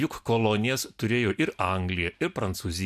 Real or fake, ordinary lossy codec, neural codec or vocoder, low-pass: real; MP3, 96 kbps; none; 14.4 kHz